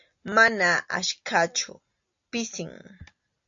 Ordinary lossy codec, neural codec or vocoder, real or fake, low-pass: AAC, 64 kbps; none; real; 7.2 kHz